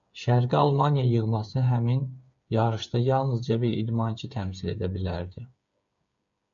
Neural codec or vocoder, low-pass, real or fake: codec, 16 kHz, 8 kbps, FreqCodec, smaller model; 7.2 kHz; fake